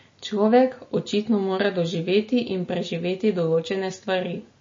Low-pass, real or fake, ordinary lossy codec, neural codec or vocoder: 7.2 kHz; real; MP3, 32 kbps; none